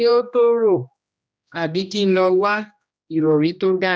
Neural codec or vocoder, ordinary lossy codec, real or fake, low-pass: codec, 16 kHz, 1 kbps, X-Codec, HuBERT features, trained on general audio; none; fake; none